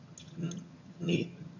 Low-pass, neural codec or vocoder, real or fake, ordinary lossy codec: 7.2 kHz; vocoder, 22.05 kHz, 80 mel bands, HiFi-GAN; fake; AAC, 48 kbps